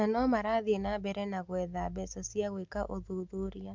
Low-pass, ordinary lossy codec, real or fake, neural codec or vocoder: 7.2 kHz; MP3, 64 kbps; real; none